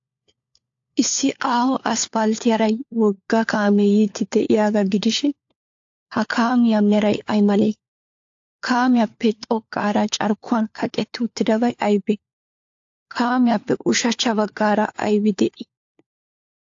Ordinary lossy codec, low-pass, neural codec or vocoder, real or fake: AAC, 48 kbps; 7.2 kHz; codec, 16 kHz, 4 kbps, FunCodec, trained on LibriTTS, 50 frames a second; fake